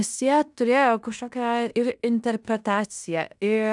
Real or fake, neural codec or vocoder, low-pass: fake; codec, 16 kHz in and 24 kHz out, 0.9 kbps, LongCat-Audio-Codec, fine tuned four codebook decoder; 10.8 kHz